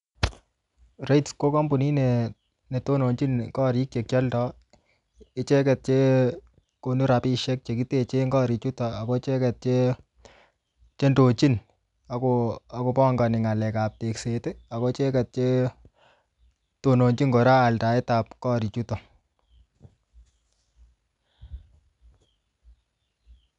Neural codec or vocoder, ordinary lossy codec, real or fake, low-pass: none; none; real; 10.8 kHz